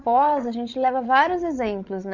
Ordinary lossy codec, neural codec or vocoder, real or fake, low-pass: none; codec, 16 kHz, 16 kbps, FreqCodec, larger model; fake; 7.2 kHz